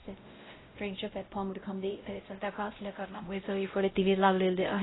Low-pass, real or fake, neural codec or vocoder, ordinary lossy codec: 7.2 kHz; fake; codec, 16 kHz, 0.5 kbps, X-Codec, WavLM features, trained on Multilingual LibriSpeech; AAC, 16 kbps